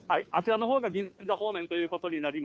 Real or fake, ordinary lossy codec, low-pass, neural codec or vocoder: fake; none; none; codec, 16 kHz, 2 kbps, FunCodec, trained on Chinese and English, 25 frames a second